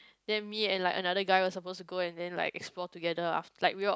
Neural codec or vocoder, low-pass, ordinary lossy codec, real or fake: none; none; none; real